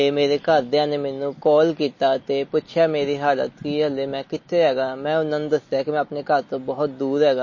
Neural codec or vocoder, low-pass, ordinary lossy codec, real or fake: none; 7.2 kHz; MP3, 32 kbps; real